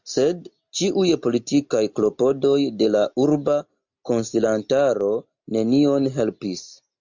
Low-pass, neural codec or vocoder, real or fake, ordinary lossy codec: 7.2 kHz; none; real; MP3, 64 kbps